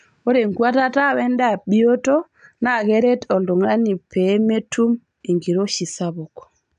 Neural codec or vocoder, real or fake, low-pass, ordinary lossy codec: none; real; 9.9 kHz; MP3, 96 kbps